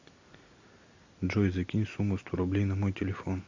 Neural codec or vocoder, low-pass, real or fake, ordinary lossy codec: none; 7.2 kHz; real; AAC, 48 kbps